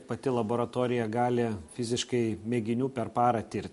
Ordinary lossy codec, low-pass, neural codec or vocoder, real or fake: MP3, 48 kbps; 14.4 kHz; none; real